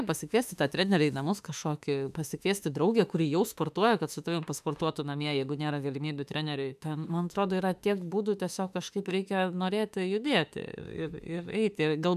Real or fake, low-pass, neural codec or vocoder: fake; 14.4 kHz; autoencoder, 48 kHz, 32 numbers a frame, DAC-VAE, trained on Japanese speech